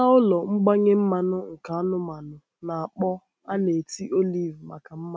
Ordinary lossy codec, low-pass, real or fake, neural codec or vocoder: none; none; real; none